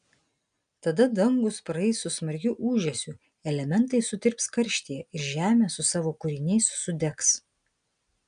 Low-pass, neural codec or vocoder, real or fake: 9.9 kHz; none; real